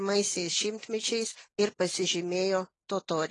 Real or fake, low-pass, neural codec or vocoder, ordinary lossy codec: real; 10.8 kHz; none; AAC, 32 kbps